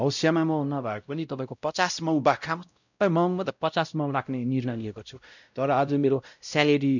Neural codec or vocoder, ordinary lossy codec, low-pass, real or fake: codec, 16 kHz, 0.5 kbps, X-Codec, WavLM features, trained on Multilingual LibriSpeech; none; 7.2 kHz; fake